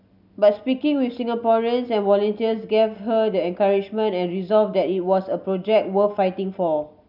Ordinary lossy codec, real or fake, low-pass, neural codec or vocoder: none; real; 5.4 kHz; none